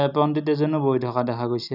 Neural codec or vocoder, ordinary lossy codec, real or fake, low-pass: none; none; real; 5.4 kHz